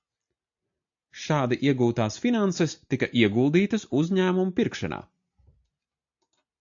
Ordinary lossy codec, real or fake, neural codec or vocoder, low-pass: AAC, 64 kbps; real; none; 7.2 kHz